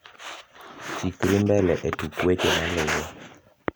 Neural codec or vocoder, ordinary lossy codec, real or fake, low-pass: none; none; real; none